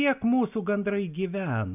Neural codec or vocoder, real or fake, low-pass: none; real; 3.6 kHz